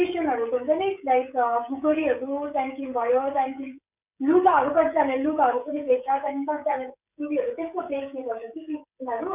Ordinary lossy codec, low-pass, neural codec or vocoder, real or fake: none; 3.6 kHz; codec, 16 kHz, 16 kbps, FreqCodec, smaller model; fake